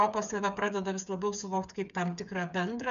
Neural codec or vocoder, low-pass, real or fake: codec, 16 kHz, 8 kbps, FreqCodec, smaller model; 7.2 kHz; fake